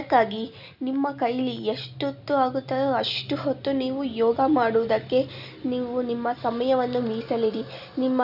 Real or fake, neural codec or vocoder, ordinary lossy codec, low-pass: real; none; none; 5.4 kHz